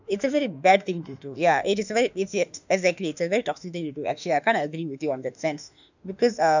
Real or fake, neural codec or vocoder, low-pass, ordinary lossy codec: fake; autoencoder, 48 kHz, 32 numbers a frame, DAC-VAE, trained on Japanese speech; 7.2 kHz; none